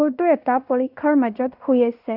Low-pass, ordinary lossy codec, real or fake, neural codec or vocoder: 5.4 kHz; none; fake; codec, 16 kHz in and 24 kHz out, 0.9 kbps, LongCat-Audio-Codec, fine tuned four codebook decoder